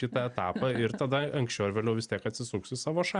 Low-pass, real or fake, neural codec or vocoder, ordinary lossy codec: 9.9 kHz; real; none; Opus, 64 kbps